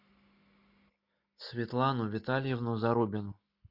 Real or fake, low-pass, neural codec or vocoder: real; 5.4 kHz; none